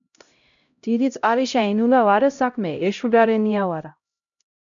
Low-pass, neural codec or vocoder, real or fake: 7.2 kHz; codec, 16 kHz, 0.5 kbps, X-Codec, HuBERT features, trained on LibriSpeech; fake